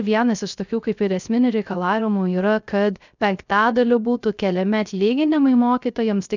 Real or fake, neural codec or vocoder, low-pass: fake; codec, 16 kHz, 0.3 kbps, FocalCodec; 7.2 kHz